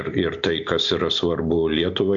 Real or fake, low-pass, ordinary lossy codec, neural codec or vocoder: real; 7.2 kHz; MP3, 64 kbps; none